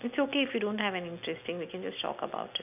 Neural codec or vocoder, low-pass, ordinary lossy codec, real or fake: none; 3.6 kHz; none; real